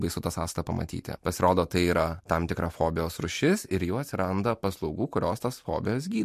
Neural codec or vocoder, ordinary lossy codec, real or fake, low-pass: none; MP3, 64 kbps; real; 14.4 kHz